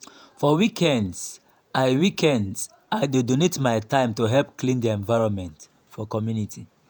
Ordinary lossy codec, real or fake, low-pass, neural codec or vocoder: none; fake; none; vocoder, 48 kHz, 128 mel bands, Vocos